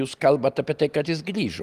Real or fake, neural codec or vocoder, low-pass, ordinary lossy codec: real; none; 14.4 kHz; Opus, 24 kbps